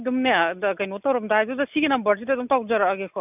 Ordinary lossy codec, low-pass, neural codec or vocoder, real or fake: none; 3.6 kHz; none; real